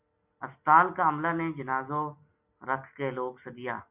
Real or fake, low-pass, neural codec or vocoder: real; 3.6 kHz; none